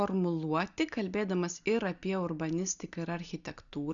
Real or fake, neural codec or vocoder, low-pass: real; none; 7.2 kHz